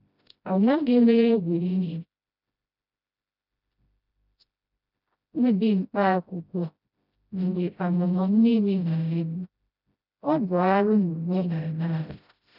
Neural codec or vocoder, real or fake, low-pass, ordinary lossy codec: codec, 16 kHz, 0.5 kbps, FreqCodec, smaller model; fake; 5.4 kHz; none